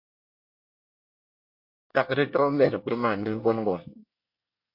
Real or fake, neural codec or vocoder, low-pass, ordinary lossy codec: fake; codec, 24 kHz, 1 kbps, SNAC; 5.4 kHz; MP3, 32 kbps